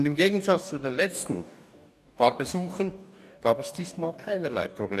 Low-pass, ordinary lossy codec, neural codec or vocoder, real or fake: 14.4 kHz; none; codec, 44.1 kHz, 2.6 kbps, DAC; fake